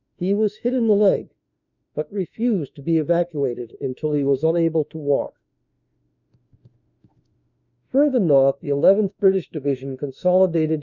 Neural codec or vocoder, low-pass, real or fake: autoencoder, 48 kHz, 32 numbers a frame, DAC-VAE, trained on Japanese speech; 7.2 kHz; fake